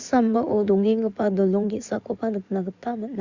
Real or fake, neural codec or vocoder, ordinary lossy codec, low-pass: fake; codec, 16 kHz in and 24 kHz out, 2.2 kbps, FireRedTTS-2 codec; Opus, 64 kbps; 7.2 kHz